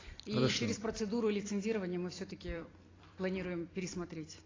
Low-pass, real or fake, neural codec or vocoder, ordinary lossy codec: 7.2 kHz; fake; vocoder, 44.1 kHz, 128 mel bands every 256 samples, BigVGAN v2; AAC, 32 kbps